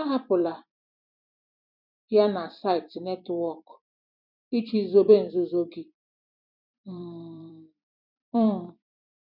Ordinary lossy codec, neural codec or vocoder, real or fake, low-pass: none; vocoder, 44.1 kHz, 128 mel bands every 256 samples, BigVGAN v2; fake; 5.4 kHz